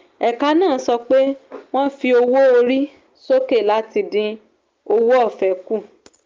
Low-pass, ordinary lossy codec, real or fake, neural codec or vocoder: 7.2 kHz; Opus, 24 kbps; real; none